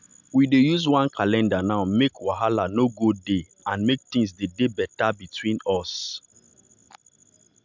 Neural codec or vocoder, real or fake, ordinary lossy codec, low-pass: none; real; MP3, 64 kbps; 7.2 kHz